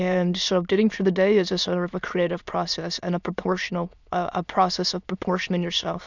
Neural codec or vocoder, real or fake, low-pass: autoencoder, 22.05 kHz, a latent of 192 numbers a frame, VITS, trained on many speakers; fake; 7.2 kHz